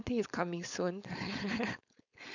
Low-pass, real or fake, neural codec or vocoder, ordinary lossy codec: 7.2 kHz; fake; codec, 16 kHz, 4.8 kbps, FACodec; none